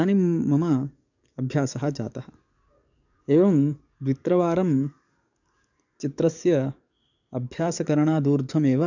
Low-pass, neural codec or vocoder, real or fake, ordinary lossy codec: 7.2 kHz; codec, 44.1 kHz, 7.8 kbps, DAC; fake; none